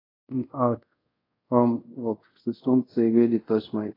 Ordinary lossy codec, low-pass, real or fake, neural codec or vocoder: AAC, 24 kbps; 5.4 kHz; fake; codec, 24 kHz, 0.5 kbps, DualCodec